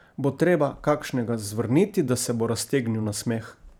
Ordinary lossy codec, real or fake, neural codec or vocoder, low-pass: none; fake; vocoder, 44.1 kHz, 128 mel bands every 512 samples, BigVGAN v2; none